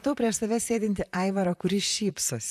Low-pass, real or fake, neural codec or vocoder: 14.4 kHz; fake; vocoder, 44.1 kHz, 128 mel bands, Pupu-Vocoder